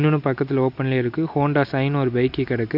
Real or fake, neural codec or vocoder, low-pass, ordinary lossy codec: real; none; 5.4 kHz; none